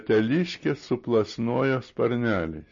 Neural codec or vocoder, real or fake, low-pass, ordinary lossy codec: none; real; 7.2 kHz; MP3, 32 kbps